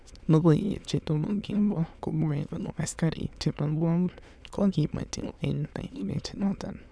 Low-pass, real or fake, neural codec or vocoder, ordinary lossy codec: none; fake; autoencoder, 22.05 kHz, a latent of 192 numbers a frame, VITS, trained on many speakers; none